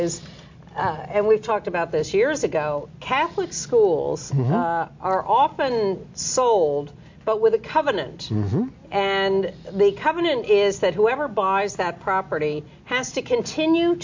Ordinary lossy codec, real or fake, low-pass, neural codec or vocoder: MP3, 48 kbps; real; 7.2 kHz; none